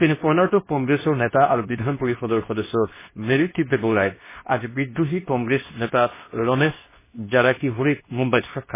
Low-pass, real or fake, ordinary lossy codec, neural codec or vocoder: 3.6 kHz; fake; MP3, 16 kbps; codec, 24 kHz, 0.9 kbps, WavTokenizer, medium speech release version 2